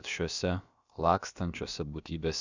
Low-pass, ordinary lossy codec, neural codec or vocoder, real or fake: 7.2 kHz; Opus, 64 kbps; codec, 16 kHz, about 1 kbps, DyCAST, with the encoder's durations; fake